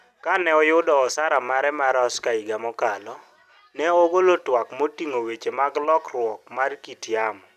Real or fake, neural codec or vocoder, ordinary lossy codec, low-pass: real; none; none; 14.4 kHz